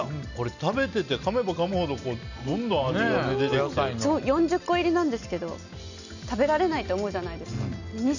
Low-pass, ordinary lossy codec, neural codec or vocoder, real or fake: 7.2 kHz; none; none; real